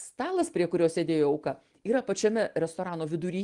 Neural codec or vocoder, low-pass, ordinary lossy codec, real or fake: none; 10.8 kHz; Opus, 24 kbps; real